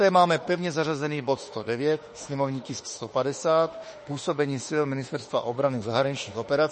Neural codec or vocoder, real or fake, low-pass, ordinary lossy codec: autoencoder, 48 kHz, 32 numbers a frame, DAC-VAE, trained on Japanese speech; fake; 10.8 kHz; MP3, 32 kbps